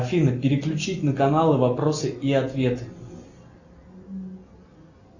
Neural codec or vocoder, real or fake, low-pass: none; real; 7.2 kHz